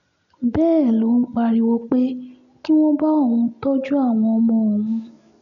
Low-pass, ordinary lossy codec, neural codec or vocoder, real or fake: 7.2 kHz; none; none; real